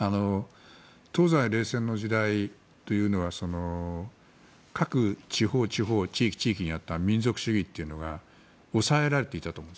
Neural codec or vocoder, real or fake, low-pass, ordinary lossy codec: none; real; none; none